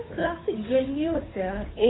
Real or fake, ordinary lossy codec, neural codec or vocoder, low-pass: fake; AAC, 16 kbps; codec, 16 kHz, 2 kbps, FunCodec, trained on Chinese and English, 25 frames a second; 7.2 kHz